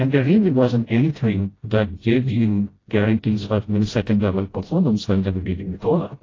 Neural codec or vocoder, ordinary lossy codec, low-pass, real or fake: codec, 16 kHz, 0.5 kbps, FreqCodec, smaller model; AAC, 32 kbps; 7.2 kHz; fake